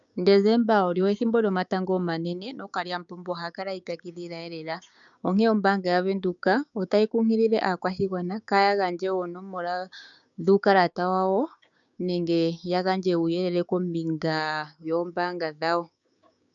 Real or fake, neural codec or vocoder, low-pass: fake; codec, 16 kHz, 6 kbps, DAC; 7.2 kHz